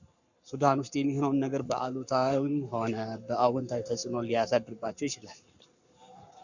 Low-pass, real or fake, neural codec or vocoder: 7.2 kHz; fake; codec, 44.1 kHz, 7.8 kbps, Pupu-Codec